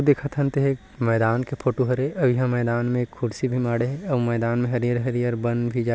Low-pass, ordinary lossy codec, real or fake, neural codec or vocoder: none; none; real; none